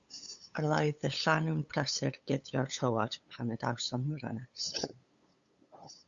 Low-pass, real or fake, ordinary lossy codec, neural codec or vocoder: 7.2 kHz; fake; Opus, 64 kbps; codec, 16 kHz, 8 kbps, FunCodec, trained on LibriTTS, 25 frames a second